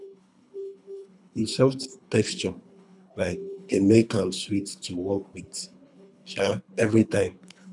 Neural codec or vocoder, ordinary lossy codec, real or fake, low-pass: codec, 24 kHz, 3 kbps, HILCodec; none; fake; 10.8 kHz